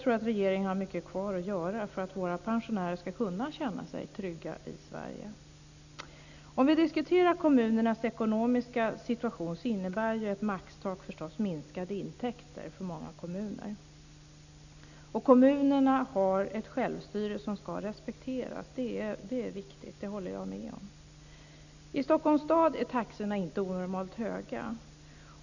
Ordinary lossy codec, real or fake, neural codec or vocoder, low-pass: none; real; none; 7.2 kHz